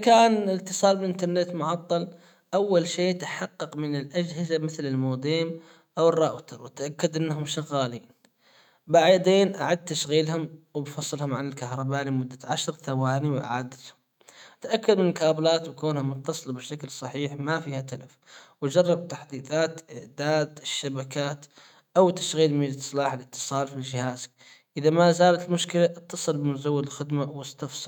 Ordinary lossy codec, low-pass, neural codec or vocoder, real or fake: none; 19.8 kHz; autoencoder, 48 kHz, 128 numbers a frame, DAC-VAE, trained on Japanese speech; fake